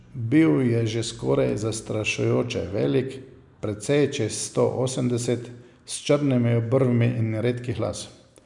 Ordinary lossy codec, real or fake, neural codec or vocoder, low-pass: none; real; none; 10.8 kHz